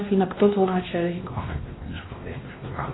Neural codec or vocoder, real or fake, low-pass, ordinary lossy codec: codec, 16 kHz, 1 kbps, X-Codec, WavLM features, trained on Multilingual LibriSpeech; fake; 7.2 kHz; AAC, 16 kbps